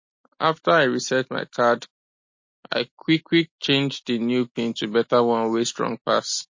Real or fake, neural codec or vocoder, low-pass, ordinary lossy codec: real; none; 7.2 kHz; MP3, 32 kbps